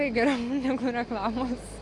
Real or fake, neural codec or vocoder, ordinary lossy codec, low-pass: real; none; AAC, 48 kbps; 10.8 kHz